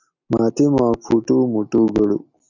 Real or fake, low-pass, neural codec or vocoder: real; 7.2 kHz; none